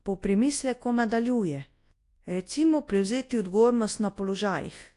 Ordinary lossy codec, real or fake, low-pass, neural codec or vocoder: AAC, 48 kbps; fake; 10.8 kHz; codec, 24 kHz, 0.9 kbps, WavTokenizer, large speech release